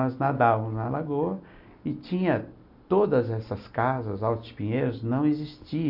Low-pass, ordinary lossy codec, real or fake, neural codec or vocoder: 5.4 kHz; none; real; none